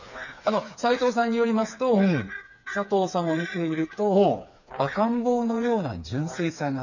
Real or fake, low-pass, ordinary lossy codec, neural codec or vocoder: fake; 7.2 kHz; none; codec, 16 kHz, 4 kbps, FreqCodec, smaller model